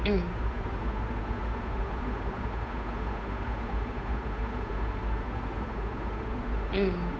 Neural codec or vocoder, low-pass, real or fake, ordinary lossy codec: codec, 16 kHz, 8 kbps, FunCodec, trained on Chinese and English, 25 frames a second; none; fake; none